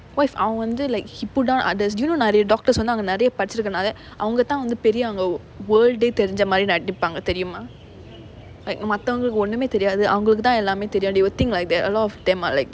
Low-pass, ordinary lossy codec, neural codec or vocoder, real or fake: none; none; none; real